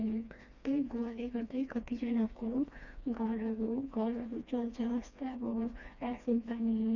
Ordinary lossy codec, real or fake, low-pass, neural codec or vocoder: none; fake; 7.2 kHz; codec, 16 kHz, 2 kbps, FreqCodec, smaller model